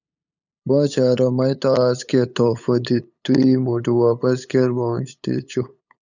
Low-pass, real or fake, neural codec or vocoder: 7.2 kHz; fake; codec, 16 kHz, 8 kbps, FunCodec, trained on LibriTTS, 25 frames a second